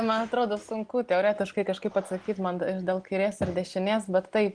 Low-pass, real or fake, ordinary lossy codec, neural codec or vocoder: 9.9 kHz; real; Opus, 24 kbps; none